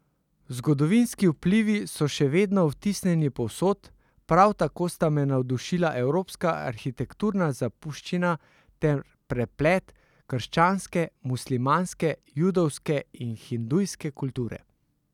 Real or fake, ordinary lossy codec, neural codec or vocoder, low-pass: real; none; none; 19.8 kHz